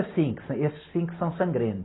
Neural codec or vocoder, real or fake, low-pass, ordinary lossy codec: none; real; 7.2 kHz; AAC, 16 kbps